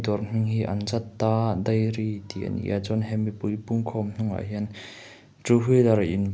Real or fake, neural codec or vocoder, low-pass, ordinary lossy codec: real; none; none; none